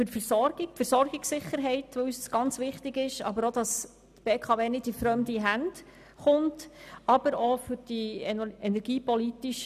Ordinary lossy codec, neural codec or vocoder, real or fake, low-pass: none; none; real; 14.4 kHz